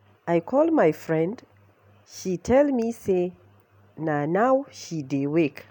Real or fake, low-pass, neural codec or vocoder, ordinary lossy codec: real; 19.8 kHz; none; none